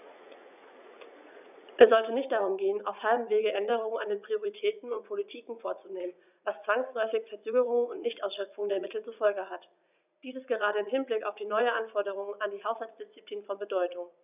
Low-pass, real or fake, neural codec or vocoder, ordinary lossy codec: 3.6 kHz; fake; vocoder, 44.1 kHz, 80 mel bands, Vocos; none